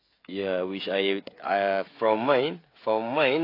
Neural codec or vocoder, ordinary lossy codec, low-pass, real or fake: codec, 16 kHz, 16 kbps, FreqCodec, smaller model; AAC, 32 kbps; 5.4 kHz; fake